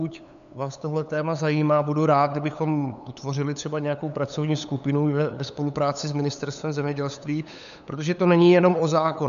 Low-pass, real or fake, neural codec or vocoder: 7.2 kHz; fake; codec, 16 kHz, 8 kbps, FunCodec, trained on LibriTTS, 25 frames a second